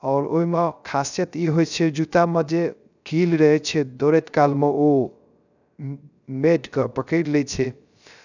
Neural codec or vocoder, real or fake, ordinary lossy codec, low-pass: codec, 16 kHz, 0.3 kbps, FocalCodec; fake; none; 7.2 kHz